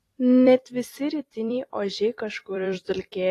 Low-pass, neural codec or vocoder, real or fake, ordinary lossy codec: 14.4 kHz; vocoder, 44.1 kHz, 128 mel bands every 256 samples, BigVGAN v2; fake; AAC, 48 kbps